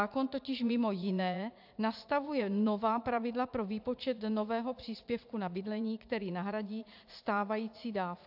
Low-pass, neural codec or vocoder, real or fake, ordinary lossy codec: 5.4 kHz; vocoder, 44.1 kHz, 80 mel bands, Vocos; fake; AAC, 48 kbps